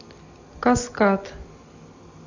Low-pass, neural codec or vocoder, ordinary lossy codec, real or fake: 7.2 kHz; none; AAC, 48 kbps; real